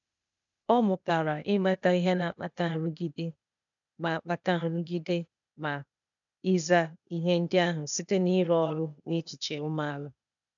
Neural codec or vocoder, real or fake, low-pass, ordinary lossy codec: codec, 16 kHz, 0.8 kbps, ZipCodec; fake; 7.2 kHz; none